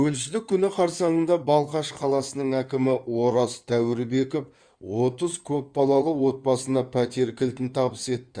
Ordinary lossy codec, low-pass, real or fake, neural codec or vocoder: Opus, 64 kbps; 9.9 kHz; fake; codec, 16 kHz in and 24 kHz out, 2.2 kbps, FireRedTTS-2 codec